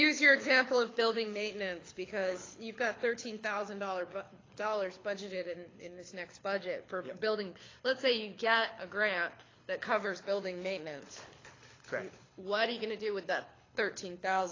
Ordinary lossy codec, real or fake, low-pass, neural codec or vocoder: AAC, 32 kbps; fake; 7.2 kHz; codec, 24 kHz, 6 kbps, HILCodec